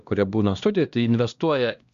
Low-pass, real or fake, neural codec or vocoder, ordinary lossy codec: 7.2 kHz; fake; codec, 16 kHz, 1 kbps, X-Codec, HuBERT features, trained on LibriSpeech; Opus, 64 kbps